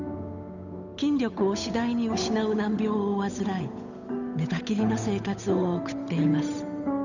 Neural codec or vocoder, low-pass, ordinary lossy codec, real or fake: codec, 16 kHz, 8 kbps, FunCodec, trained on Chinese and English, 25 frames a second; 7.2 kHz; none; fake